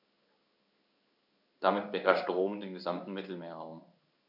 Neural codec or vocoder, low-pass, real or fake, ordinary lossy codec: codec, 16 kHz in and 24 kHz out, 1 kbps, XY-Tokenizer; 5.4 kHz; fake; none